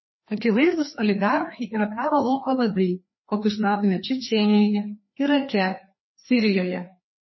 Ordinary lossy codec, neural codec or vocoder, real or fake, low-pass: MP3, 24 kbps; codec, 16 kHz, 2 kbps, FreqCodec, larger model; fake; 7.2 kHz